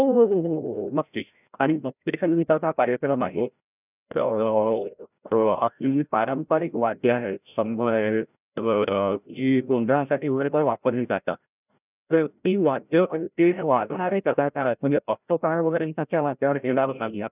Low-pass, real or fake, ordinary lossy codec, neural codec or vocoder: 3.6 kHz; fake; none; codec, 16 kHz, 0.5 kbps, FreqCodec, larger model